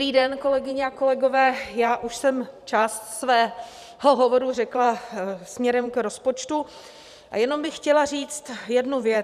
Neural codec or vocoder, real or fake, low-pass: vocoder, 44.1 kHz, 128 mel bands every 512 samples, BigVGAN v2; fake; 14.4 kHz